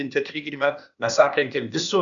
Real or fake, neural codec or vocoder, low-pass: fake; codec, 16 kHz, 0.8 kbps, ZipCodec; 7.2 kHz